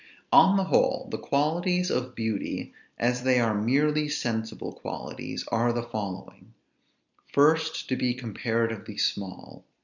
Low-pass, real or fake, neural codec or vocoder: 7.2 kHz; real; none